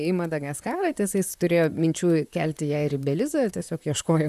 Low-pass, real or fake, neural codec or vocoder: 14.4 kHz; fake; vocoder, 44.1 kHz, 128 mel bands, Pupu-Vocoder